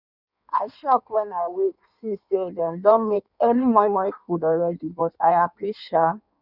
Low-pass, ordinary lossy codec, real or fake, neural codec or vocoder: 5.4 kHz; none; fake; codec, 16 kHz in and 24 kHz out, 1.1 kbps, FireRedTTS-2 codec